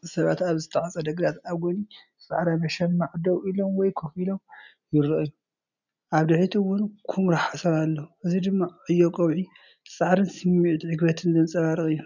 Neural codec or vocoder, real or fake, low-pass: none; real; 7.2 kHz